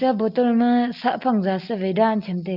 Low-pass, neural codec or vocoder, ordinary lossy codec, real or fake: 5.4 kHz; none; Opus, 32 kbps; real